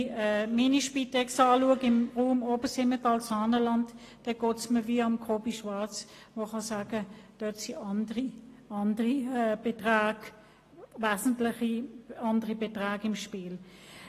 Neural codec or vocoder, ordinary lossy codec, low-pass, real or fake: none; AAC, 48 kbps; 14.4 kHz; real